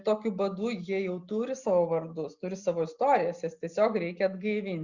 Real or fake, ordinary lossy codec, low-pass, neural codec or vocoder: real; Opus, 64 kbps; 7.2 kHz; none